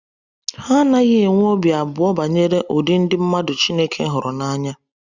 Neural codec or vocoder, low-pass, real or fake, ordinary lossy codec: none; 7.2 kHz; real; Opus, 64 kbps